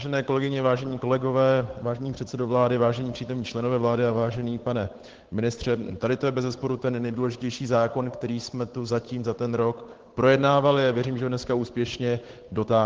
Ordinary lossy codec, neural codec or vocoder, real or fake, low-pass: Opus, 16 kbps; codec, 16 kHz, 8 kbps, FunCodec, trained on Chinese and English, 25 frames a second; fake; 7.2 kHz